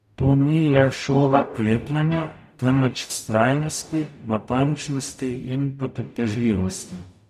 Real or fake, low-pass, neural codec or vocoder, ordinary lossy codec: fake; 14.4 kHz; codec, 44.1 kHz, 0.9 kbps, DAC; none